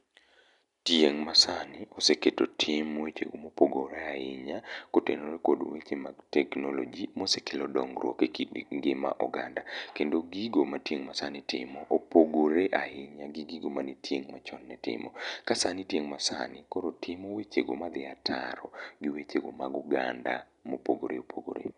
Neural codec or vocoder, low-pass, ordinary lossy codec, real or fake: none; 10.8 kHz; none; real